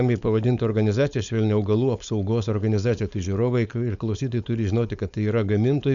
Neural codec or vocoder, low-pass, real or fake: codec, 16 kHz, 4.8 kbps, FACodec; 7.2 kHz; fake